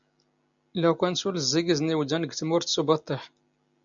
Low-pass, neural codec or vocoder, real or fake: 7.2 kHz; none; real